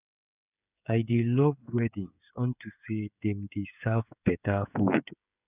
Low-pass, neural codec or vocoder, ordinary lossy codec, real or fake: 3.6 kHz; codec, 16 kHz, 8 kbps, FreqCodec, smaller model; none; fake